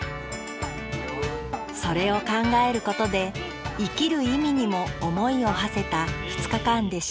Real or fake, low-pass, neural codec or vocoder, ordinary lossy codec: real; none; none; none